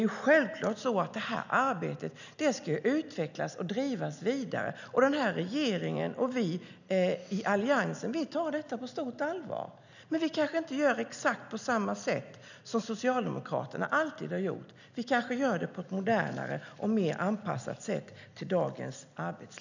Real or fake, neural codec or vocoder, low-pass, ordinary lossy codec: real; none; 7.2 kHz; none